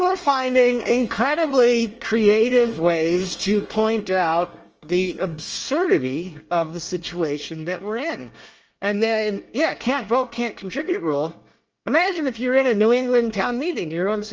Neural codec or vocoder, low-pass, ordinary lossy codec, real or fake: codec, 24 kHz, 1 kbps, SNAC; 7.2 kHz; Opus, 24 kbps; fake